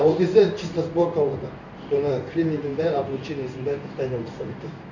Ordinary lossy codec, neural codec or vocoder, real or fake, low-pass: none; codec, 16 kHz in and 24 kHz out, 1 kbps, XY-Tokenizer; fake; 7.2 kHz